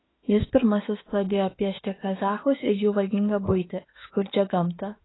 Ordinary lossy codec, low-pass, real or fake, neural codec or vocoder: AAC, 16 kbps; 7.2 kHz; fake; autoencoder, 48 kHz, 32 numbers a frame, DAC-VAE, trained on Japanese speech